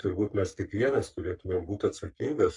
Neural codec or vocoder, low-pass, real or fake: codec, 44.1 kHz, 3.4 kbps, Pupu-Codec; 10.8 kHz; fake